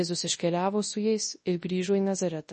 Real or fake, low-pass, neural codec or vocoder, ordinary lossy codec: fake; 10.8 kHz; codec, 24 kHz, 0.9 kbps, WavTokenizer, large speech release; MP3, 32 kbps